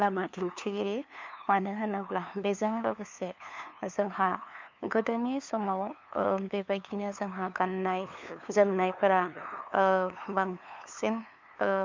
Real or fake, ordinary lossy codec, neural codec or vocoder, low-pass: fake; MP3, 64 kbps; codec, 16 kHz, 2 kbps, FunCodec, trained on LibriTTS, 25 frames a second; 7.2 kHz